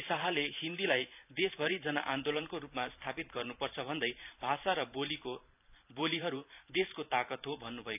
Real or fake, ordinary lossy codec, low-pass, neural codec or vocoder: fake; none; 3.6 kHz; vocoder, 44.1 kHz, 128 mel bands every 512 samples, BigVGAN v2